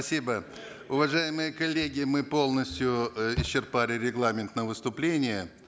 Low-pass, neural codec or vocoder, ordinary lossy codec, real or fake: none; none; none; real